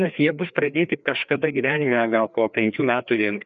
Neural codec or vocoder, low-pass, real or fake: codec, 16 kHz, 2 kbps, FreqCodec, larger model; 7.2 kHz; fake